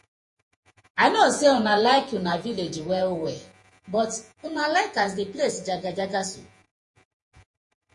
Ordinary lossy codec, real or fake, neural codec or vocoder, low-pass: MP3, 48 kbps; fake; vocoder, 48 kHz, 128 mel bands, Vocos; 10.8 kHz